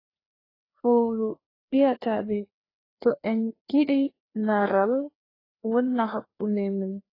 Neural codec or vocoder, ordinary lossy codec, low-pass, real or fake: codec, 16 kHz, 2 kbps, FreqCodec, larger model; AAC, 24 kbps; 5.4 kHz; fake